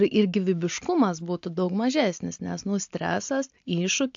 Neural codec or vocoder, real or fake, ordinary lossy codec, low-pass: none; real; AAC, 64 kbps; 7.2 kHz